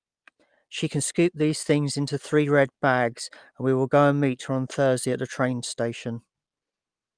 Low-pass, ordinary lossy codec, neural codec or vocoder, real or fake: 9.9 kHz; Opus, 32 kbps; none; real